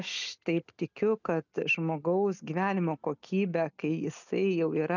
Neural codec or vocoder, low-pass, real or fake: none; 7.2 kHz; real